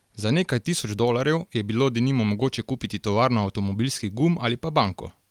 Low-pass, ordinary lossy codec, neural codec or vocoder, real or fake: 19.8 kHz; Opus, 32 kbps; none; real